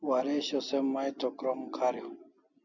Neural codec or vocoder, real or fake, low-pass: none; real; 7.2 kHz